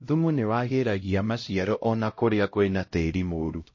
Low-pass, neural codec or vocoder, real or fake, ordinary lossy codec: 7.2 kHz; codec, 16 kHz, 0.5 kbps, X-Codec, HuBERT features, trained on LibriSpeech; fake; MP3, 32 kbps